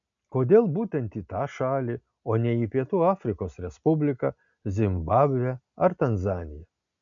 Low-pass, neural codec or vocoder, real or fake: 7.2 kHz; none; real